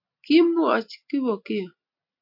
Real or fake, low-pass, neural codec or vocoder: real; 5.4 kHz; none